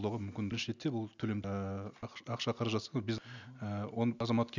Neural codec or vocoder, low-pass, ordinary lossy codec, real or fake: none; 7.2 kHz; none; real